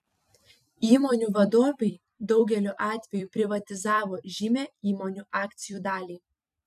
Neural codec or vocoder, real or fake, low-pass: vocoder, 44.1 kHz, 128 mel bands every 256 samples, BigVGAN v2; fake; 14.4 kHz